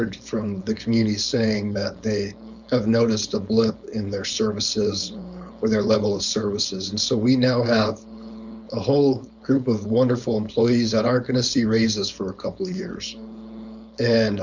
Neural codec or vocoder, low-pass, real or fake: codec, 16 kHz, 4.8 kbps, FACodec; 7.2 kHz; fake